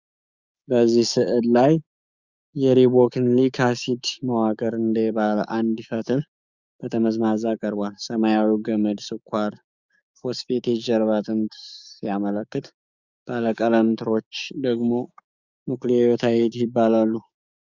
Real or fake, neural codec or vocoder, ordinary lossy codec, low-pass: fake; codec, 16 kHz, 6 kbps, DAC; Opus, 64 kbps; 7.2 kHz